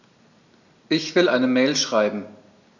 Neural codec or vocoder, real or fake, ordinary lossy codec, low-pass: none; real; none; 7.2 kHz